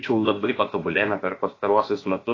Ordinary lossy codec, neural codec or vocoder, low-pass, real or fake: AAC, 32 kbps; codec, 16 kHz, about 1 kbps, DyCAST, with the encoder's durations; 7.2 kHz; fake